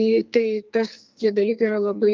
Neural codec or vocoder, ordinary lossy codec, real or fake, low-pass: codec, 44.1 kHz, 2.6 kbps, SNAC; Opus, 24 kbps; fake; 7.2 kHz